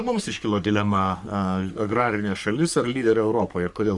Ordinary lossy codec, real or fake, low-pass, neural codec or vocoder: Opus, 64 kbps; fake; 10.8 kHz; codec, 44.1 kHz, 3.4 kbps, Pupu-Codec